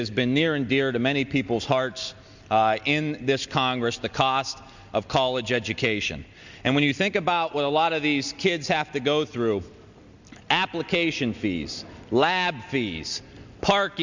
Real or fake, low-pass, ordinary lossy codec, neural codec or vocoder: real; 7.2 kHz; Opus, 64 kbps; none